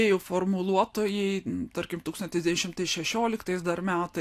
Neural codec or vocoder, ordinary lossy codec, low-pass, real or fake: none; AAC, 64 kbps; 14.4 kHz; real